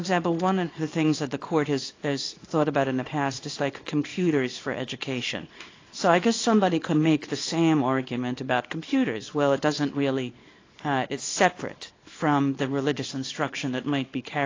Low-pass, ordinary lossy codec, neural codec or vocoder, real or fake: 7.2 kHz; AAC, 32 kbps; codec, 24 kHz, 0.9 kbps, WavTokenizer, small release; fake